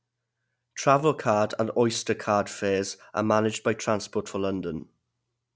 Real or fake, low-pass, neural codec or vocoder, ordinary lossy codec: real; none; none; none